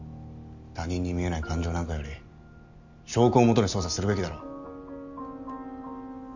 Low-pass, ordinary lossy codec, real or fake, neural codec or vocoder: 7.2 kHz; none; real; none